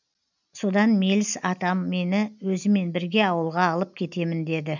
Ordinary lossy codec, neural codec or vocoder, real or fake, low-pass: none; none; real; 7.2 kHz